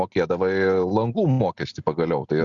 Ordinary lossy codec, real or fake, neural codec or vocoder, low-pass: Opus, 64 kbps; real; none; 7.2 kHz